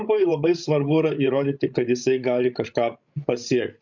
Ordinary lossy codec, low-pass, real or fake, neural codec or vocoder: MP3, 64 kbps; 7.2 kHz; fake; codec, 16 kHz, 16 kbps, FreqCodec, larger model